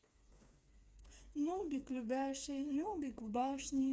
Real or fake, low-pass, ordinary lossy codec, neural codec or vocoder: fake; none; none; codec, 16 kHz, 4 kbps, FreqCodec, smaller model